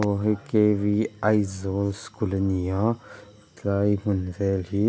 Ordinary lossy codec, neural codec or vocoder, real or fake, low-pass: none; none; real; none